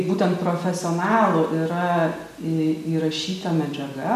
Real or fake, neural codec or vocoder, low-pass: real; none; 14.4 kHz